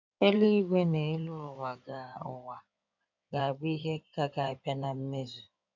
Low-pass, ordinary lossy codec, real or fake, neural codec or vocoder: 7.2 kHz; none; fake; codec, 16 kHz in and 24 kHz out, 2.2 kbps, FireRedTTS-2 codec